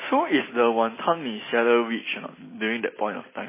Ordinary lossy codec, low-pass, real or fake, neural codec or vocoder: MP3, 16 kbps; 3.6 kHz; real; none